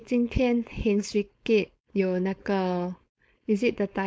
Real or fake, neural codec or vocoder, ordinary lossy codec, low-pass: fake; codec, 16 kHz, 4.8 kbps, FACodec; none; none